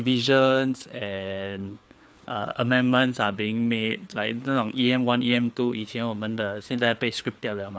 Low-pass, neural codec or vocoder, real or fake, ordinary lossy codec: none; codec, 16 kHz, 4 kbps, FreqCodec, larger model; fake; none